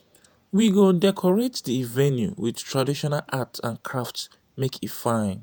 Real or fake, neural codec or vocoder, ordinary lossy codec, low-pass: fake; vocoder, 48 kHz, 128 mel bands, Vocos; none; none